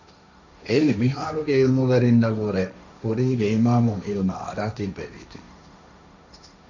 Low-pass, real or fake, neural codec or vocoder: 7.2 kHz; fake; codec, 16 kHz, 1.1 kbps, Voila-Tokenizer